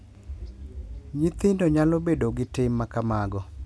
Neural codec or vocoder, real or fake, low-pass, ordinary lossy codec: none; real; none; none